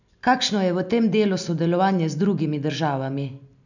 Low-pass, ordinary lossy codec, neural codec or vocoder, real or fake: 7.2 kHz; none; none; real